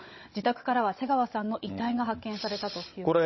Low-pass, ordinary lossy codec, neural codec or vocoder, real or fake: 7.2 kHz; MP3, 24 kbps; codec, 16 kHz, 16 kbps, FunCodec, trained on Chinese and English, 50 frames a second; fake